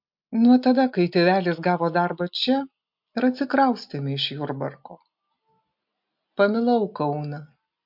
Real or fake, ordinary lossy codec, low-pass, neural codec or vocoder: real; MP3, 48 kbps; 5.4 kHz; none